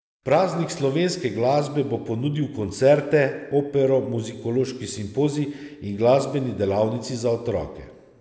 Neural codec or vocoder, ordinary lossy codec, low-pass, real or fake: none; none; none; real